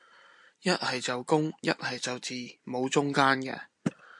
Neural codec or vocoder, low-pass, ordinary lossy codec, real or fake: none; 10.8 kHz; MP3, 64 kbps; real